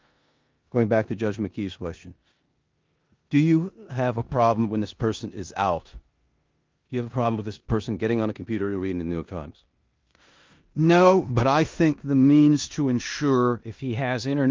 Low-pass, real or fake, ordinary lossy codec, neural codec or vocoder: 7.2 kHz; fake; Opus, 32 kbps; codec, 16 kHz in and 24 kHz out, 0.9 kbps, LongCat-Audio-Codec, four codebook decoder